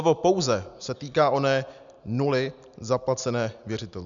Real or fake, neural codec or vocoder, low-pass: real; none; 7.2 kHz